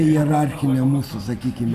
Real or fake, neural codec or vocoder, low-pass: real; none; 14.4 kHz